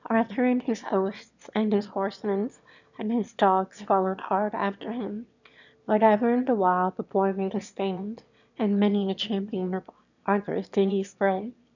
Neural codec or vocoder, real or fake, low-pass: autoencoder, 22.05 kHz, a latent of 192 numbers a frame, VITS, trained on one speaker; fake; 7.2 kHz